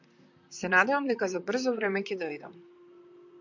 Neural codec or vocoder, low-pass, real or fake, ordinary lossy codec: vocoder, 44.1 kHz, 128 mel bands, Pupu-Vocoder; 7.2 kHz; fake; MP3, 64 kbps